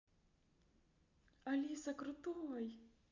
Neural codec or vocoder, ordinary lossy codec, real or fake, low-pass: none; Opus, 64 kbps; real; 7.2 kHz